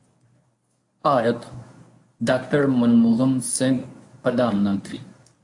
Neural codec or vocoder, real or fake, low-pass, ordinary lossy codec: codec, 24 kHz, 0.9 kbps, WavTokenizer, medium speech release version 1; fake; 10.8 kHz; AAC, 64 kbps